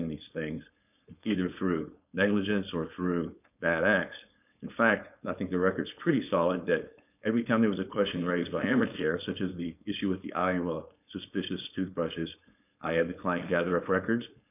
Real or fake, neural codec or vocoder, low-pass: fake; codec, 16 kHz, 4.8 kbps, FACodec; 3.6 kHz